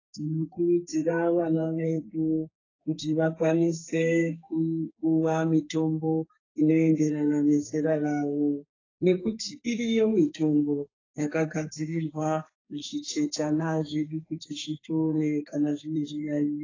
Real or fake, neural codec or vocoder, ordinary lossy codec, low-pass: fake; codec, 32 kHz, 1.9 kbps, SNAC; AAC, 32 kbps; 7.2 kHz